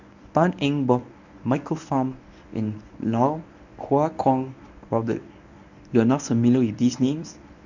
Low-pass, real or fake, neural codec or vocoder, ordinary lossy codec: 7.2 kHz; fake; codec, 24 kHz, 0.9 kbps, WavTokenizer, medium speech release version 1; none